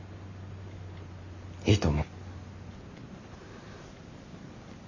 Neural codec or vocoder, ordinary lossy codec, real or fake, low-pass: none; none; real; 7.2 kHz